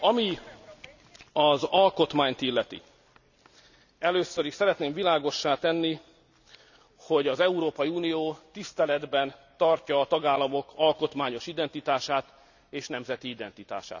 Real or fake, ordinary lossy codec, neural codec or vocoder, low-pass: real; none; none; 7.2 kHz